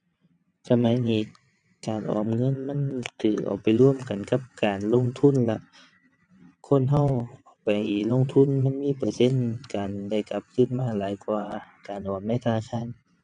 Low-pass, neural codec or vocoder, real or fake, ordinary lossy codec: 9.9 kHz; vocoder, 22.05 kHz, 80 mel bands, WaveNeXt; fake; none